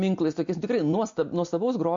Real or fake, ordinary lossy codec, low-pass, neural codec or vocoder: real; MP3, 48 kbps; 7.2 kHz; none